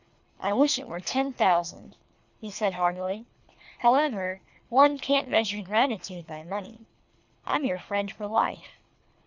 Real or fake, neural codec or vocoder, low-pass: fake; codec, 24 kHz, 3 kbps, HILCodec; 7.2 kHz